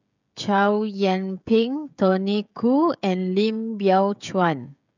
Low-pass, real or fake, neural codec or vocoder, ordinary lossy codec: 7.2 kHz; fake; codec, 16 kHz, 16 kbps, FreqCodec, smaller model; none